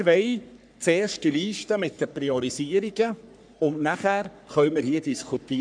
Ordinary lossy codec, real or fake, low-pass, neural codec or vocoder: none; fake; 9.9 kHz; codec, 44.1 kHz, 3.4 kbps, Pupu-Codec